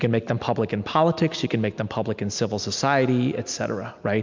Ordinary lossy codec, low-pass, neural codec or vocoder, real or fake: MP3, 64 kbps; 7.2 kHz; none; real